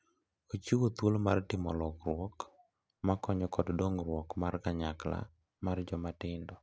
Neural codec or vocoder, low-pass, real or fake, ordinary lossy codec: none; none; real; none